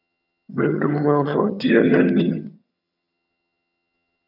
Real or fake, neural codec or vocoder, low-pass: fake; vocoder, 22.05 kHz, 80 mel bands, HiFi-GAN; 5.4 kHz